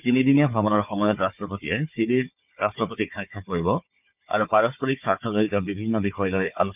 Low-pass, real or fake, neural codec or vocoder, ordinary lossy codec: 3.6 kHz; fake; codec, 16 kHz, 4 kbps, FunCodec, trained on Chinese and English, 50 frames a second; none